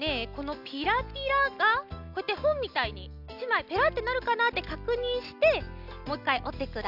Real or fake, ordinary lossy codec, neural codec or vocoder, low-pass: real; none; none; 5.4 kHz